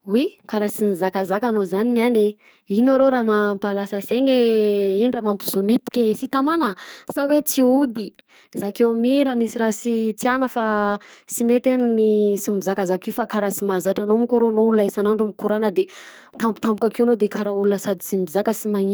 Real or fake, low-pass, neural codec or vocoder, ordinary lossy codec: fake; none; codec, 44.1 kHz, 2.6 kbps, SNAC; none